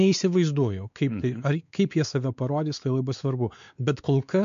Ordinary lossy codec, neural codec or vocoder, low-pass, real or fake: MP3, 64 kbps; codec, 16 kHz, 4 kbps, X-Codec, WavLM features, trained on Multilingual LibriSpeech; 7.2 kHz; fake